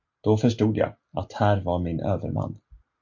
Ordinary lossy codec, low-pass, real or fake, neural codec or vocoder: MP3, 48 kbps; 7.2 kHz; real; none